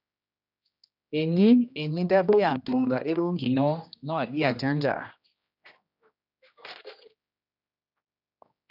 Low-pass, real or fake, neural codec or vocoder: 5.4 kHz; fake; codec, 16 kHz, 1 kbps, X-Codec, HuBERT features, trained on general audio